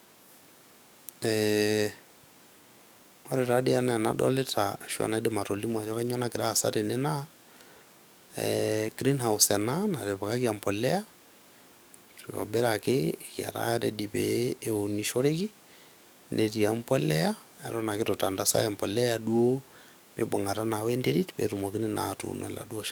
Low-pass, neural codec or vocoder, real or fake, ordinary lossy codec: none; codec, 44.1 kHz, 7.8 kbps, DAC; fake; none